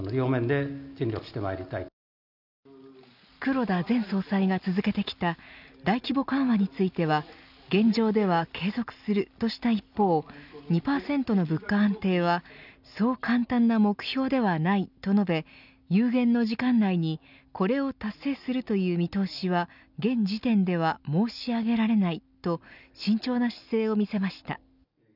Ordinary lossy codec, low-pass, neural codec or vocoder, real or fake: none; 5.4 kHz; none; real